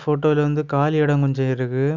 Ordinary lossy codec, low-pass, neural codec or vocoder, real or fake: none; 7.2 kHz; none; real